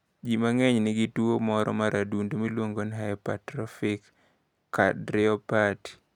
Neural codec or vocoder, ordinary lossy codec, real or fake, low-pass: none; none; real; 19.8 kHz